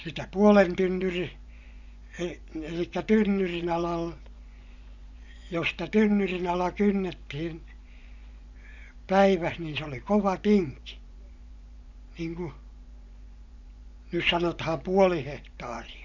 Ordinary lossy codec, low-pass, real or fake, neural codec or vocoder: none; 7.2 kHz; fake; vocoder, 24 kHz, 100 mel bands, Vocos